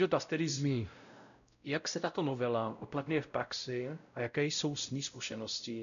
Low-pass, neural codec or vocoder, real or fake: 7.2 kHz; codec, 16 kHz, 0.5 kbps, X-Codec, WavLM features, trained on Multilingual LibriSpeech; fake